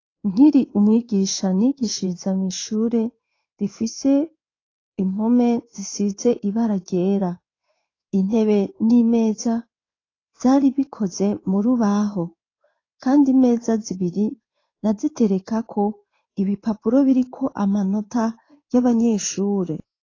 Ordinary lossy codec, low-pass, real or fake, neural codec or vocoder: AAC, 32 kbps; 7.2 kHz; fake; codec, 16 kHz in and 24 kHz out, 1 kbps, XY-Tokenizer